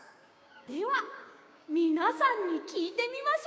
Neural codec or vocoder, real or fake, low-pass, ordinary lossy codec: codec, 16 kHz, 6 kbps, DAC; fake; none; none